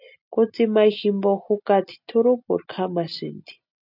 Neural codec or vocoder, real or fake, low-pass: none; real; 5.4 kHz